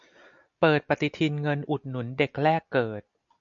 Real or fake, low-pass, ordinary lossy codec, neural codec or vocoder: real; 7.2 kHz; MP3, 96 kbps; none